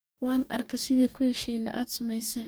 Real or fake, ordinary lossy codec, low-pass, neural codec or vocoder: fake; none; none; codec, 44.1 kHz, 2.6 kbps, DAC